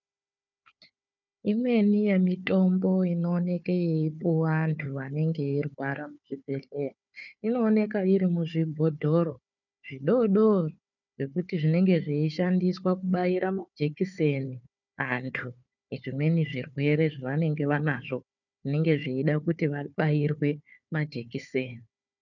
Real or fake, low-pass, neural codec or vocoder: fake; 7.2 kHz; codec, 16 kHz, 4 kbps, FunCodec, trained on Chinese and English, 50 frames a second